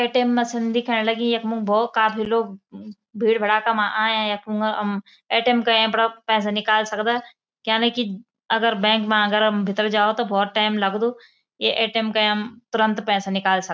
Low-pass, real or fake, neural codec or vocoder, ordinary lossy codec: none; real; none; none